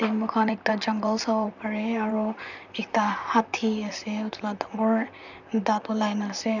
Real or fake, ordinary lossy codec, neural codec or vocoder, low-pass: fake; none; vocoder, 44.1 kHz, 128 mel bands, Pupu-Vocoder; 7.2 kHz